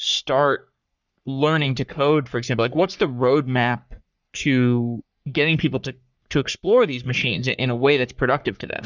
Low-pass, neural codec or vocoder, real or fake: 7.2 kHz; codec, 44.1 kHz, 3.4 kbps, Pupu-Codec; fake